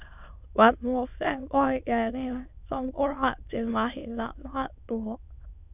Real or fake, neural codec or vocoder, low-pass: fake; autoencoder, 22.05 kHz, a latent of 192 numbers a frame, VITS, trained on many speakers; 3.6 kHz